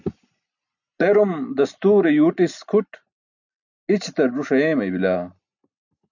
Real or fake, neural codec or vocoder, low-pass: real; none; 7.2 kHz